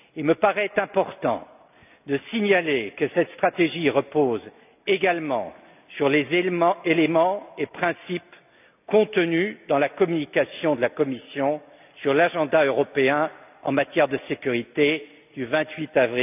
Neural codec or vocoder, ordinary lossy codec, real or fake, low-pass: none; none; real; 3.6 kHz